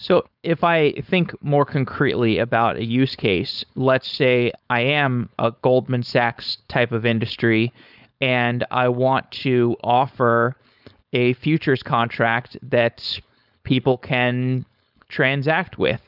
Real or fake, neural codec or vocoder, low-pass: fake; codec, 16 kHz, 4.8 kbps, FACodec; 5.4 kHz